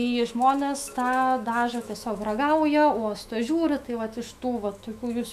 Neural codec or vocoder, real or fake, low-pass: autoencoder, 48 kHz, 128 numbers a frame, DAC-VAE, trained on Japanese speech; fake; 14.4 kHz